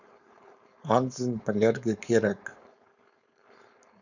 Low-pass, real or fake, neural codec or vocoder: 7.2 kHz; fake; codec, 16 kHz, 4.8 kbps, FACodec